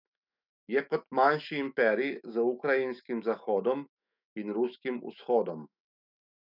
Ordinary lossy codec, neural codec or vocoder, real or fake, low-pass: none; autoencoder, 48 kHz, 128 numbers a frame, DAC-VAE, trained on Japanese speech; fake; 5.4 kHz